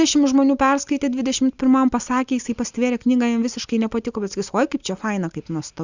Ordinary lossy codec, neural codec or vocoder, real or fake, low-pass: Opus, 64 kbps; none; real; 7.2 kHz